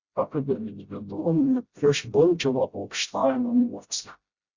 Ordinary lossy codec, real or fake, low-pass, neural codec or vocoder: Opus, 64 kbps; fake; 7.2 kHz; codec, 16 kHz, 0.5 kbps, FreqCodec, smaller model